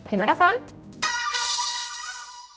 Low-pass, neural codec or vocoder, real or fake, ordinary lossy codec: none; codec, 16 kHz, 0.5 kbps, X-Codec, HuBERT features, trained on general audio; fake; none